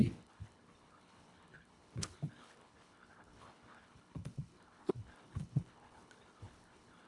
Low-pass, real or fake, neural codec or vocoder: 10.8 kHz; fake; codec, 24 kHz, 1.5 kbps, HILCodec